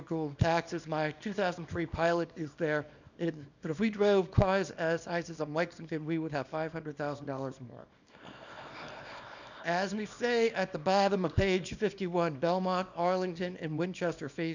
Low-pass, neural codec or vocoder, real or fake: 7.2 kHz; codec, 24 kHz, 0.9 kbps, WavTokenizer, small release; fake